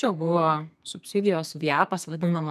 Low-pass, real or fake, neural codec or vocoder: 14.4 kHz; fake; codec, 32 kHz, 1.9 kbps, SNAC